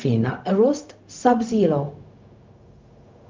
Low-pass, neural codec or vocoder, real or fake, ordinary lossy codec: 7.2 kHz; codec, 16 kHz, 0.4 kbps, LongCat-Audio-Codec; fake; Opus, 24 kbps